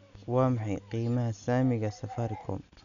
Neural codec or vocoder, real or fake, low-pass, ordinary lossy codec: none; real; 7.2 kHz; none